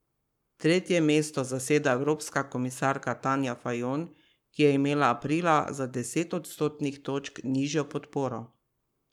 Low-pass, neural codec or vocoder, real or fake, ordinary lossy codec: 19.8 kHz; codec, 44.1 kHz, 7.8 kbps, Pupu-Codec; fake; none